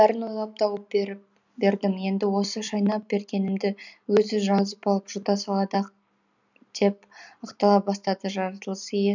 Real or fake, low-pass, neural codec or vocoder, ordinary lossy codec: real; 7.2 kHz; none; none